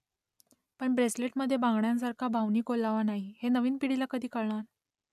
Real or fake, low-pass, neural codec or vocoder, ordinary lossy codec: real; 14.4 kHz; none; none